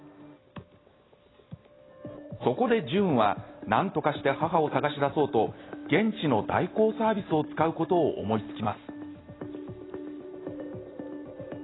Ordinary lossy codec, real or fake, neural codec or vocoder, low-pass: AAC, 16 kbps; real; none; 7.2 kHz